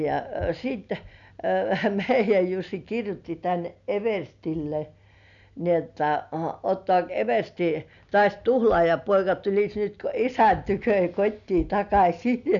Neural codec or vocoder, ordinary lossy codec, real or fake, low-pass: none; none; real; 7.2 kHz